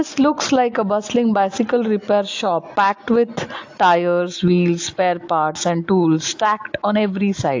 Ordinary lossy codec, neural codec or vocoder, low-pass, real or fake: AAC, 48 kbps; none; 7.2 kHz; real